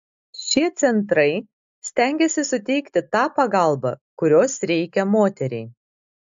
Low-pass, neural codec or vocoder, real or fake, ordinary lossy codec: 7.2 kHz; none; real; AAC, 64 kbps